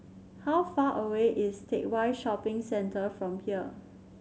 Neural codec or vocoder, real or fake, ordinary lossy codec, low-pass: none; real; none; none